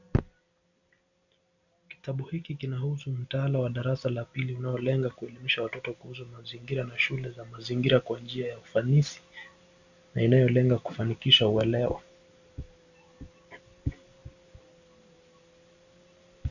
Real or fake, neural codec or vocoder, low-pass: real; none; 7.2 kHz